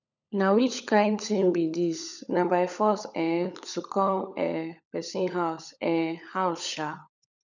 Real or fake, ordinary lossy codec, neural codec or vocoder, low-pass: fake; none; codec, 16 kHz, 16 kbps, FunCodec, trained on LibriTTS, 50 frames a second; 7.2 kHz